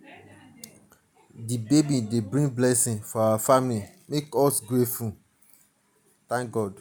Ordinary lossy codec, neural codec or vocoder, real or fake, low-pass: none; none; real; none